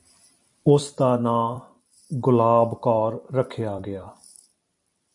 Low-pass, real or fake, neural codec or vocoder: 10.8 kHz; fake; vocoder, 44.1 kHz, 128 mel bands every 256 samples, BigVGAN v2